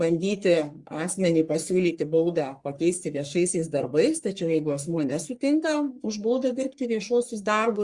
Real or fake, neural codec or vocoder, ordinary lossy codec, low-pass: fake; codec, 44.1 kHz, 3.4 kbps, Pupu-Codec; Opus, 64 kbps; 10.8 kHz